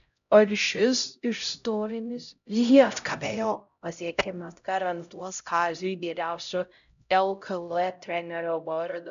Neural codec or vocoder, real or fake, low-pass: codec, 16 kHz, 0.5 kbps, X-Codec, HuBERT features, trained on LibriSpeech; fake; 7.2 kHz